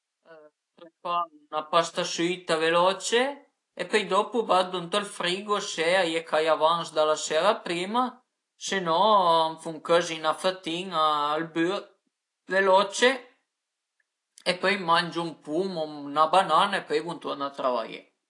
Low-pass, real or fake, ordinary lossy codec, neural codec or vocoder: 10.8 kHz; real; AAC, 48 kbps; none